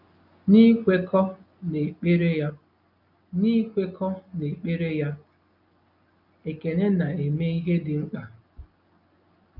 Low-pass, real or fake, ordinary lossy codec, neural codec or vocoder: 5.4 kHz; real; none; none